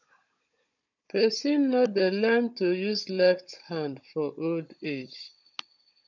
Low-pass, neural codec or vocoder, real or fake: 7.2 kHz; codec, 16 kHz, 16 kbps, FunCodec, trained on Chinese and English, 50 frames a second; fake